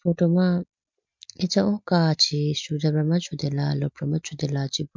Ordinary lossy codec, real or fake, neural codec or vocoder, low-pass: MP3, 64 kbps; real; none; 7.2 kHz